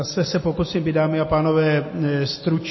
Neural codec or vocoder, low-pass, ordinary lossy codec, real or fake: none; 7.2 kHz; MP3, 24 kbps; real